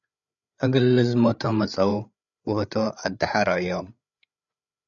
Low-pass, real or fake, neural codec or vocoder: 7.2 kHz; fake; codec, 16 kHz, 8 kbps, FreqCodec, larger model